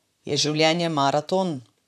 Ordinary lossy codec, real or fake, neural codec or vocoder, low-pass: none; fake; vocoder, 44.1 kHz, 128 mel bands, Pupu-Vocoder; 14.4 kHz